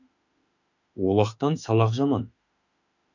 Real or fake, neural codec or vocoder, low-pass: fake; autoencoder, 48 kHz, 32 numbers a frame, DAC-VAE, trained on Japanese speech; 7.2 kHz